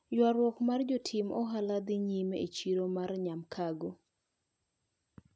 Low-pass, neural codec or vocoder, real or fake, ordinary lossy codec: none; none; real; none